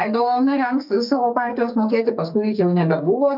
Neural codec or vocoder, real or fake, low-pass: codec, 44.1 kHz, 2.6 kbps, SNAC; fake; 5.4 kHz